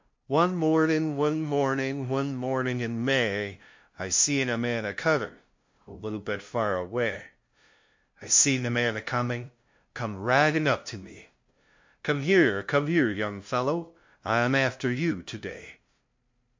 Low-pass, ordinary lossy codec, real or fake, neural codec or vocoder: 7.2 kHz; MP3, 48 kbps; fake; codec, 16 kHz, 0.5 kbps, FunCodec, trained on LibriTTS, 25 frames a second